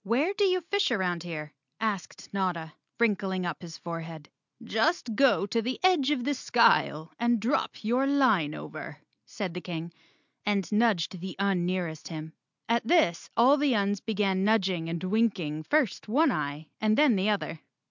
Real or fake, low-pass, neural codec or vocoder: real; 7.2 kHz; none